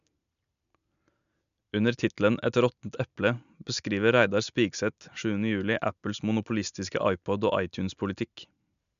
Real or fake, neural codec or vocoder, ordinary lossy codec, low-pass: real; none; none; 7.2 kHz